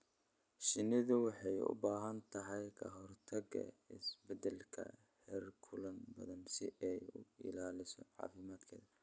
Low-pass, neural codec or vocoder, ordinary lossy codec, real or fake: none; none; none; real